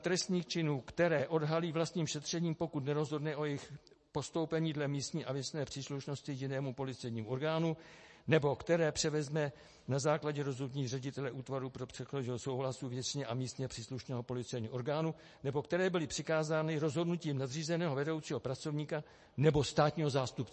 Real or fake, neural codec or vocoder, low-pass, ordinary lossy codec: real; none; 10.8 kHz; MP3, 32 kbps